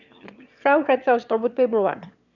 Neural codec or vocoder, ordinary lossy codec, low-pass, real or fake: autoencoder, 22.05 kHz, a latent of 192 numbers a frame, VITS, trained on one speaker; none; 7.2 kHz; fake